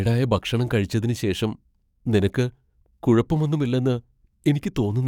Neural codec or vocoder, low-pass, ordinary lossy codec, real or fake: codec, 44.1 kHz, 7.8 kbps, DAC; 19.8 kHz; none; fake